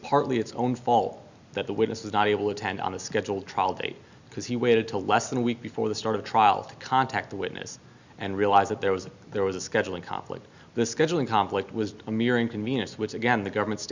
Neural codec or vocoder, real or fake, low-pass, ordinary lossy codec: none; real; 7.2 kHz; Opus, 64 kbps